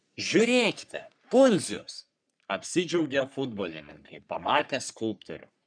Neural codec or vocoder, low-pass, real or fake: codec, 44.1 kHz, 3.4 kbps, Pupu-Codec; 9.9 kHz; fake